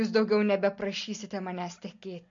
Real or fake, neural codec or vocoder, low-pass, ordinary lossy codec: real; none; 7.2 kHz; MP3, 48 kbps